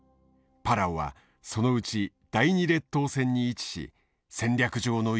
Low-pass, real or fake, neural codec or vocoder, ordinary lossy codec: none; real; none; none